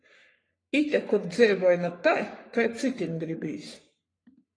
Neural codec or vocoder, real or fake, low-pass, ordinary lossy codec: codec, 44.1 kHz, 3.4 kbps, Pupu-Codec; fake; 9.9 kHz; AAC, 32 kbps